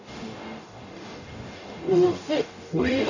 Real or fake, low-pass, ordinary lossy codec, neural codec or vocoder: fake; 7.2 kHz; none; codec, 44.1 kHz, 0.9 kbps, DAC